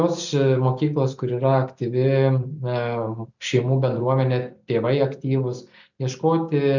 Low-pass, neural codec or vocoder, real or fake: 7.2 kHz; none; real